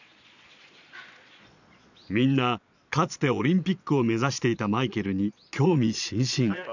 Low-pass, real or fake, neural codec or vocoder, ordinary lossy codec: 7.2 kHz; fake; vocoder, 22.05 kHz, 80 mel bands, Vocos; none